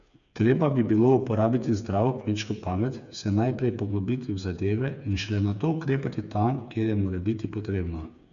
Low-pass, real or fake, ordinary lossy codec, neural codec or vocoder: 7.2 kHz; fake; none; codec, 16 kHz, 4 kbps, FreqCodec, smaller model